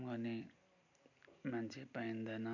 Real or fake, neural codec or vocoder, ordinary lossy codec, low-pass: real; none; none; 7.2 kHz